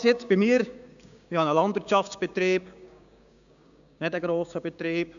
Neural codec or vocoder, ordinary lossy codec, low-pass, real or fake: codec, 16 kHz, 6 kbps, DAC; none; 7.2 kHz; fake